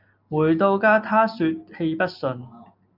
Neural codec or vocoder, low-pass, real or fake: none; 5.4 kHz; real